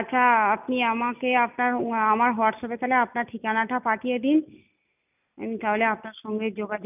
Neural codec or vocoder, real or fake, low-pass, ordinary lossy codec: none; real; 3.6 kHz; none